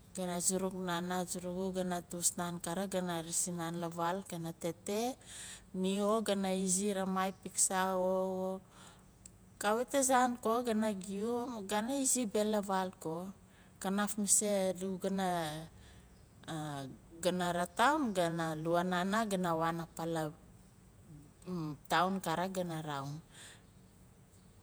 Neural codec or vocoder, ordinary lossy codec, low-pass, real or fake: vocoder, 48 kHz, 128 mel bands, Vocos; none; none; fake